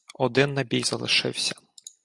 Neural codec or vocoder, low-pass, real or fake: none; 10.8 kHz; real